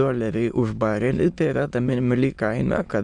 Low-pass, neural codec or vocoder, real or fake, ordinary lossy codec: 9.9 kHz; autoencoder, 22.05 kHz, a latent of 192 numbers a frame, VITS, trained on many speakers; fake; Opus, 64 kbps